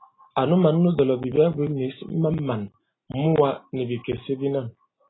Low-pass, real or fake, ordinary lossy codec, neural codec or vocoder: 7.2 kHz; real; AAC, 16 kbps; none